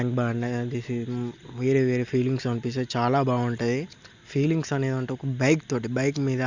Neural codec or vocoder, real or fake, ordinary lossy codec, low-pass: none; real; Opus, 64 kbps; 7.2 kHz